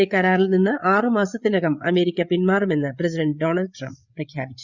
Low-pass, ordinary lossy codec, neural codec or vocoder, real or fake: 7.2 kHz; Opus, 64 kbps; codec, 16 kHz, 8 kbps, FreqCodec, larger model; fake